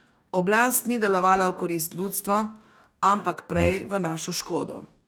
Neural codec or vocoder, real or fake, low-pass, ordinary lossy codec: codec, 44.1 kHz, 2.6 kbps, DAC; fake; none; none